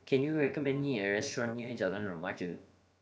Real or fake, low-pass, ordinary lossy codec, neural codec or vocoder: fake; none; none; codec, 16 kHz, about 1 kbps, DyCAST, with the encoder's durations